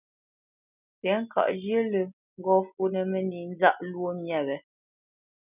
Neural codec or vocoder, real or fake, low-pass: none; real; 3.6 kHz